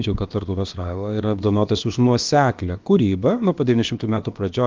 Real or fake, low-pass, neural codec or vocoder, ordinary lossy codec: fake; 7.2 kHz; codec, 16 kHz, about 1 kbps, DyCAST, with the encoder's durations; Opus, 32 kbps